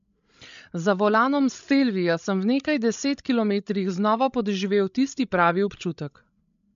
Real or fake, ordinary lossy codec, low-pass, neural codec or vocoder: fake; MP3, 64 kbps; 7.2 kHz; codec, 16 kHz, 16 kbps, FreqCodec, larger model